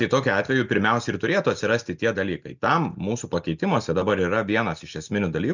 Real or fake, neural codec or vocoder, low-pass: real; none; 7.2 kHz